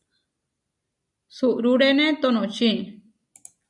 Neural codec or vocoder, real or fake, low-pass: none; real; 10.8 kHz